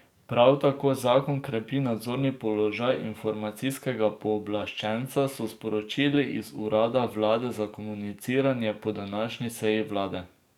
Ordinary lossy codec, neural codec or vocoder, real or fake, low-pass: none; codec, 44.1 kHz, 7.8 kbps, DAC; fake; 19.8 kHz